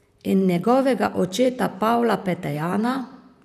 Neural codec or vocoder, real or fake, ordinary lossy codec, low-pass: vocoder, 44.1 kHz, 128 mel bands, Pupu-Vocoder; fake; none; 14.4 kHz